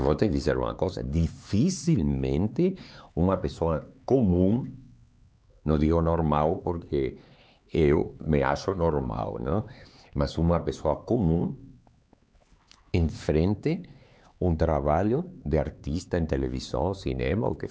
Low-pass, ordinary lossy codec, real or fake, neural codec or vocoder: none; none; fake; codec, 16 kHz, 4 kbps, X-Codec, HuBERT features, trained on LibriSpeech